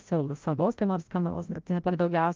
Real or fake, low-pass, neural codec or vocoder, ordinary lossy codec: fake; 7.2 kHz; codec, 16 kHz, 0.5 kbps, FreqCodec, larger model; Opus, 32 kbps